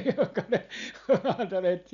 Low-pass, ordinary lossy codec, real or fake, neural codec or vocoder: 7.2 kHz; none; real; none